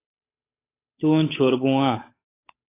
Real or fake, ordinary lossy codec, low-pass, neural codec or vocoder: fake; AAC, 24 kbps; 3.6 kHz; codec, 16 kHz, 8 kbps, FunCodec, trained on Chinese and English, 25 frames a second